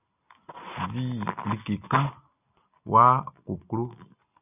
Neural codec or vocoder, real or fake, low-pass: none; real; 3.6 kHz